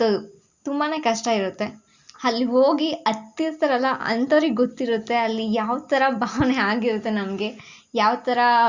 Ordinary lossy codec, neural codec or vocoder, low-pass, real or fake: Opus, 64 kbps; none; 7.2 kHz; real